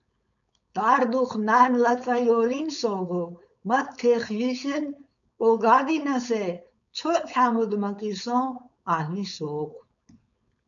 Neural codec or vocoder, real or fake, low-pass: codec, 16 kHz, 4.8 kbps, FACodec; fake; 7.2 kHz